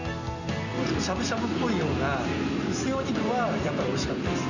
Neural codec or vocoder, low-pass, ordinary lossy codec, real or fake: none; 7.2 kHz; none; real